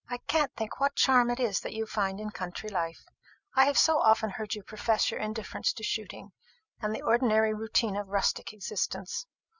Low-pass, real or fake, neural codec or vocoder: 7.2 kHz; real; none